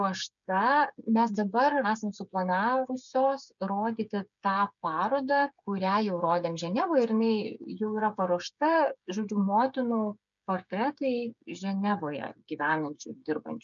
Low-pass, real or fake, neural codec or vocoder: 7.2 kHz; fake; codec, 16 kHz, 8 kbps, FreqCodec, smaller model